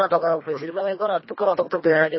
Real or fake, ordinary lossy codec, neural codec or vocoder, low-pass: fake; MP3, 24 kbps; codec, 24 kHz, 1.5 kbps, HILCodec; 7.2 kHz